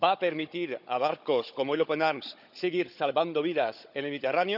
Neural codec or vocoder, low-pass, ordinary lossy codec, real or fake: codec, 16 kHz, 16 kbps, FunCodec, trained on Chinese and English, 50 frames a second; 5.4 kHz; none; fake